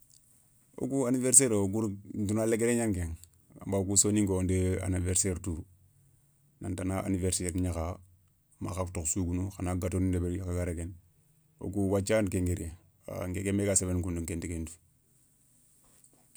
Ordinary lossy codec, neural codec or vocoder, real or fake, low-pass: none; none; real; none